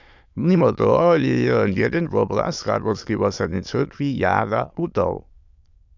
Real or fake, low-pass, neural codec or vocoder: fake; 7.2 kHz; autoencoder, 22.05 kHz, a latent of 192 numbers a frame, VITS, trained on many speakers